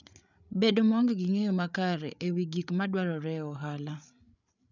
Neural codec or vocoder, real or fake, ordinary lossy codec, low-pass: codec, 16 kHz, 8 kbps, FreqCodec, larger model; fake; none; 7.2 kHz